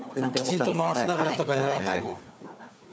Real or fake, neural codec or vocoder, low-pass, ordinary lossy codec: fake; codec, 16 kHz, 4 kbps, FunCodec, trained on Chinese and English, 50 frames a second; none; none